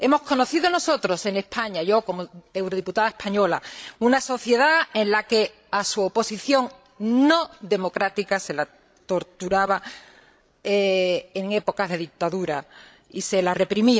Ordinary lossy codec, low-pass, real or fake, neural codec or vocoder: none; none; fake; codec, 16 kHz, 16 kbps, FreqCodec, larger model